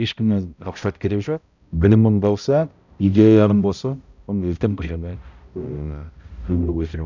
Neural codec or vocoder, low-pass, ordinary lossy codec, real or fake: codec, 16 kHz, 0.5 kbps, X-Codec, HuBERT features, trained on balanced general audio; 7.2 kHz; none; fake